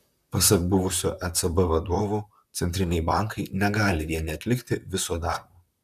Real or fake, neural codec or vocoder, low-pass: fake; vocoder, 44.1 kHz, 128 mel bands, Pupu-Vocoder; 14.4 kHz